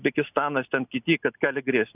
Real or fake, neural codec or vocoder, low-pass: real; none; 3.6 kHz